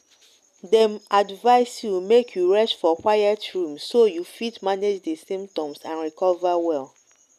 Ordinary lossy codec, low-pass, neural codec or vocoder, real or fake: none; 14.4 kHz; none; real